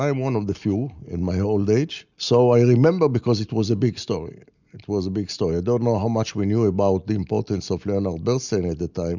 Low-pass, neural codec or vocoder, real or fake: 7.2 kHz; none; real